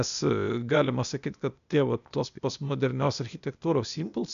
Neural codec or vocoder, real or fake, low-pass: codec, 16 kHz, 0.8 kbps, ZipCodec; fake; 7.2 kHz